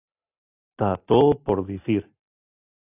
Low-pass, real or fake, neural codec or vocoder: 3.6 kHz; real; none